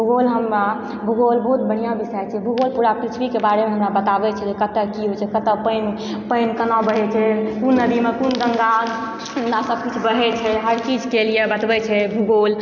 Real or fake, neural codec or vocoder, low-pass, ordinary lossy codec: real; none; 7.2 kHz; none